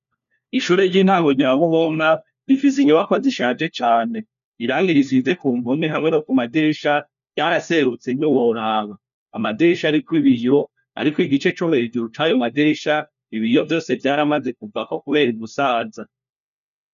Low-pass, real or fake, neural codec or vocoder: 7.2 kHz; fake; codec, 16 kHz, 1 kbps, FunCodec, trained on LibriTTS, 50 frames a second